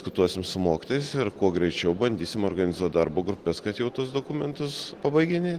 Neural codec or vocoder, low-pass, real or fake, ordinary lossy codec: none; 14.4 kHz; real; Opus, 16 kbps